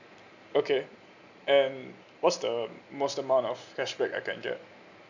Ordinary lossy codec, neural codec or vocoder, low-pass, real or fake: none; none; 7.2 kHz; real